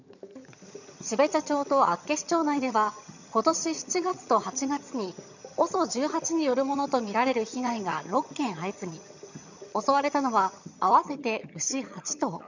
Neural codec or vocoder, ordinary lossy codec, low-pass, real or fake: vocoder, 22.05 kHz, 80 mel bands, HiFi-GAN; none; 7.2 kHz; fake